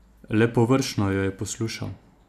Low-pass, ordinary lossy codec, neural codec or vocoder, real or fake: 14.4 kHz; none; none; real